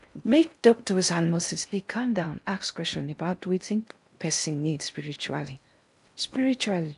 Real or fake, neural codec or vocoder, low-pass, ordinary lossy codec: fake; codec, 16 kHz in and 24 kHz out, 0.6 kbps, FocalCodec, streaming, 4096 codes; 10.8 kHz; none